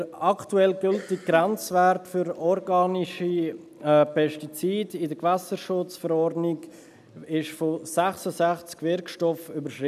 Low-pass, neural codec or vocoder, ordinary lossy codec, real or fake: 14.4 kHz; none; none; real